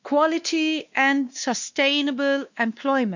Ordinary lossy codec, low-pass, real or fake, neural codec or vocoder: none; 7.2 kHz; fake; codec, 16 kHz, 2 kbps, X-Codec, WavLM features, trained on Multilingual LibriSpeech